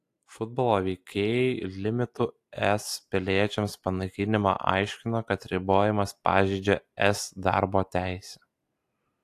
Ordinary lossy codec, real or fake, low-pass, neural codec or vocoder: AAC, 64 kbps; real; 14.4 kHz; none